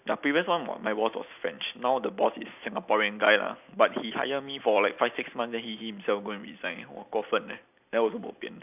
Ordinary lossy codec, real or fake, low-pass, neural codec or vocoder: none; real; 3.6 kHz; none